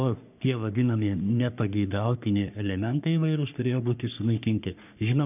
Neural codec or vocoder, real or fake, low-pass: codec, 44.1 kHz, 3.4 kbps, Pupu-Codec; fake; 3.6 kHz